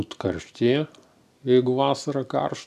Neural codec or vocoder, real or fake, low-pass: none; real; 14.4 kHz